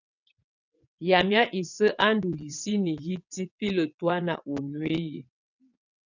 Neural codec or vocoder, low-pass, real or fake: vocoder, 22.05 kHz, 80 mel bands, WaveNeXt; 7.2 kHz; fake